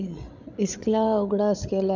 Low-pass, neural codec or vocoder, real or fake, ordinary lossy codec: 7.2 kHz; codec, 16 kHz, 16 kbps, FreqCodec, larger model; fake; none